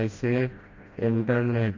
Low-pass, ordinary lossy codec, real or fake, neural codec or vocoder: 7.2 kHz; MP3, 48 kbps; fake; codec, 16 kHz, 1 kbps, FreqCodec, smaller model